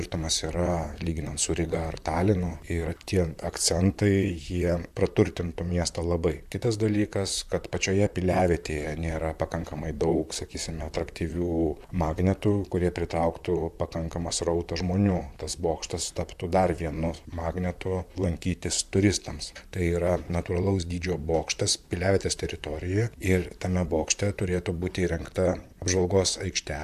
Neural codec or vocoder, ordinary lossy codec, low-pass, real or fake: vocoder, 44.1 kHz, 128 mel bands, Pupu-Vocoder; AAC, 96 kbps; 14.4 kHz; fake